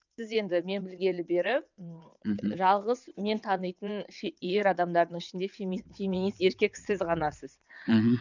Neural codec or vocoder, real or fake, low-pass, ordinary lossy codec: vocoder, 22.05 kHz, 80 mel bands, WaveNeXt; fake; 7.2 kHz; none